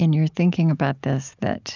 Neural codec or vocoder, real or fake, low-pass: none; real; 7.2 kHz